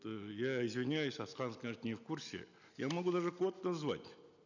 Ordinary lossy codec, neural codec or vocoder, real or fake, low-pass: none; none; real; 7.2 kHz